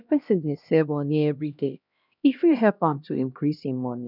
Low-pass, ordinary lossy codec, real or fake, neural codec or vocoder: 5.4 kHz; none; fake; codec, 16 kHz, 1 kbps, X-Codec, HuBERT features, trained on LibriSpeech